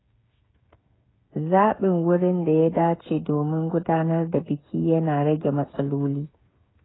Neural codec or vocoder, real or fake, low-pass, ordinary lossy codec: codec, 16 kHz, 8 kbps, FreqCodec, smaller model; fake; 7.2 kHz; AAC, 16 kbps